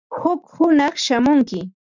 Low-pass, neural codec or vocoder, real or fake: 7.2 kHz; none; real